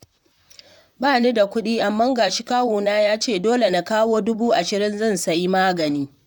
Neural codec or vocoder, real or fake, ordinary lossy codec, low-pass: vocoder, 44.1 kHz, 128 mel bands, Pupu-Vocoder; fake; none; 19.8 kHz